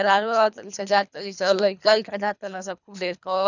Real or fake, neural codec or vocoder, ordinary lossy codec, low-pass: fake; codec, 24 kHz, 3 kbps, HILCodec; none; 7.2 kHz